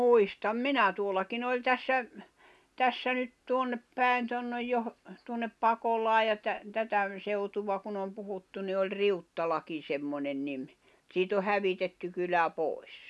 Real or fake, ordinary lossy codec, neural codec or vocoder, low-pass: real; none; none; none